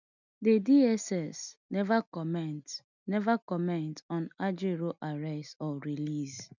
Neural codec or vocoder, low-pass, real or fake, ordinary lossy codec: none; 7.2 kHz; real; none